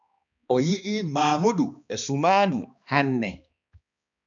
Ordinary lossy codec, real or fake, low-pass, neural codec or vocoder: MP3, 96 kbps; fake; 7.2 kHz; codec, 16 kHz, 2 kbps, X-Codec, HuBERT features, trained on balanced general audio